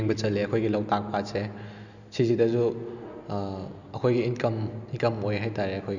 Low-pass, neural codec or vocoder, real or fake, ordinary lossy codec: 7.2 kHz; none; real; none